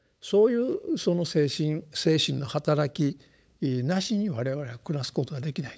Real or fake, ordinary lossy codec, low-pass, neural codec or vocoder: fake; none; none; codec, 16 kHz, 8 kbps, FunCodec, trained on LibriTTS, 25 frames a second